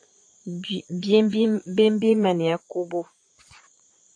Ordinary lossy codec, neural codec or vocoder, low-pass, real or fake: AAC, 48 kbps; vocoder, 22.05 kHz, 80 mel bands, Vocos; 9.9 kHz; fake